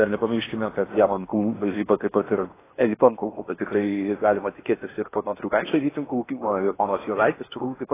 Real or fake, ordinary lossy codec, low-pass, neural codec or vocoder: fake; AAC, 16 kbps; 3.6 kHz; codec, 16 kHz in and 24 kHz out, 0.8 kbps, FocalCodec, streaming, 65536 codes